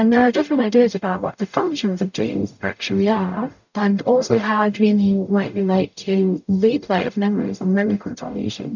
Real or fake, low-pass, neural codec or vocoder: fake; 7.2 kHz; codec, 44.1 kHz, 0.9 kbps, DAC